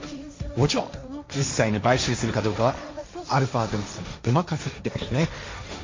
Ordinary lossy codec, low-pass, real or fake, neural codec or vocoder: MP3, 48 kbps; 7.2 kHz; fake; codec, 16 kHz, 1.1 kbps, Voila-Tokenizer